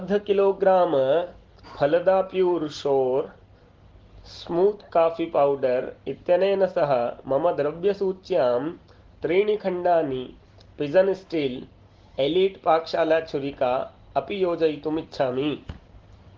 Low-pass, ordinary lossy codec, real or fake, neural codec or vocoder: 7.2 kHz; Opus, 16 kbps; real; none